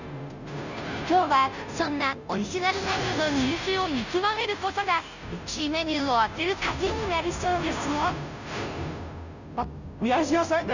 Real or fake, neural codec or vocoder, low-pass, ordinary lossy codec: fake; codec, 16 kHz, 0.5 kbps, FunCodec, trained on Chinese and English, 25 frames a second; 7.2 kHz; none